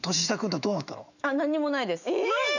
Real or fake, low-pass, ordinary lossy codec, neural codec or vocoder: fake; 7.2 kHz; none; autoencoder, 48 kHz, 128 numbers a frame, DAC-VAE, trained on Japanese speech